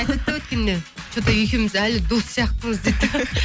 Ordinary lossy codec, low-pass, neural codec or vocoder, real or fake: none; none; none; real